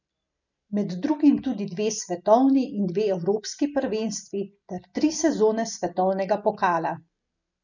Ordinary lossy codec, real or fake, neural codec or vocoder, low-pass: none; real; none; 7.2 kHz